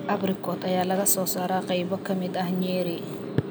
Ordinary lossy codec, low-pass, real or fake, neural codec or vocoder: none; none; real; none